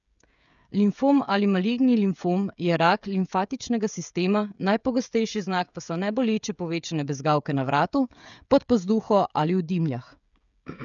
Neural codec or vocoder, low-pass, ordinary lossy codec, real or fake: codec, 16 kHz, 16 kbps, FreqCodec, smaller model; 7.2 kHz; none; fake